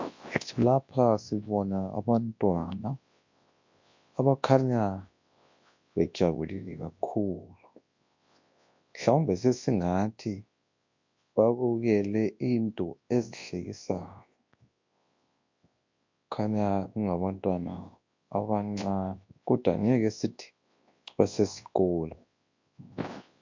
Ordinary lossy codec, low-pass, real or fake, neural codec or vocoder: MP3, 48 kbps; 7.2 kHz; fake; codec, 24 kHz, 0.9 kbps, WavTokenizer, large speech release